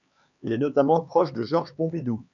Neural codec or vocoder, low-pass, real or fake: codec, 16 kHz, 4 kbps, X-Codec, HuBERT features, trained on LibriSpeech; 7.2 kHz; fake